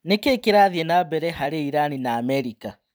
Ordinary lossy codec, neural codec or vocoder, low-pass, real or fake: none; vocoder, 44.1 kHz, 128 mel bands every 256 samples, BigVGAN v2; none; fake